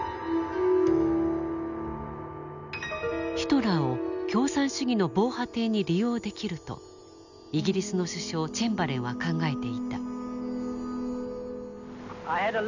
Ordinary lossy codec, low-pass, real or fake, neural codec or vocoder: none; 7.2 kHz; real; none